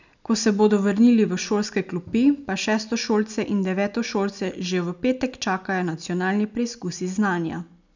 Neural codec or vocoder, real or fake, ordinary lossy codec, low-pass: none; real; none; 7.2 kHz